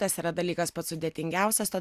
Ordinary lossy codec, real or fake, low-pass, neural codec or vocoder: Opus, 64 kbps; real; 14.4 kHz; none